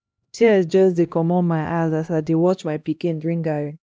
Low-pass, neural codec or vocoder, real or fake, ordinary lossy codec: none; codec, 16 kHz, 1 kbps, X-Codec, HuBERT features, trained on LibriSpeech; fake; none